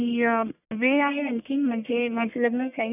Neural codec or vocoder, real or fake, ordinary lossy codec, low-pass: codec, 44.1 kHz, 1.7 kbps, Pupu-Codec; fake; none; 3.6 kHz